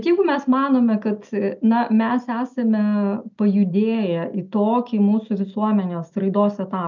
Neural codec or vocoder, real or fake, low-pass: none; real; 7.2 kHz